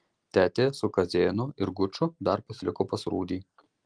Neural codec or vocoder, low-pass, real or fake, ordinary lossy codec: none; 9.9 kHz; real; Opus, 24 kbps